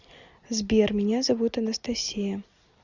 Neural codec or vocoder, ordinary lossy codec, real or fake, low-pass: none; Opus, 64 kbps; real; 7.2 kHz